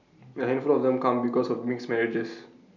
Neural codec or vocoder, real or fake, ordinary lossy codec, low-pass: none; real; none; 7.2 kHz